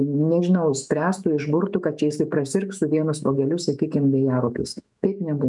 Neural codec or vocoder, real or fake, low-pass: autoencoder, 48 kHz, 128 numbers a frame, DAC-VAE, trained on Japanese speech; fake; 10.8 kHz